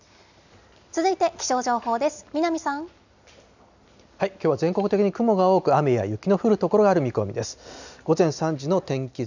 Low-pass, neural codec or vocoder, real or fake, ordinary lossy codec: 7.2 kHz; none; real; none